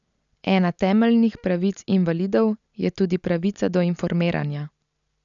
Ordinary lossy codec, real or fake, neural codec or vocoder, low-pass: none; real; none; 7.2 kHz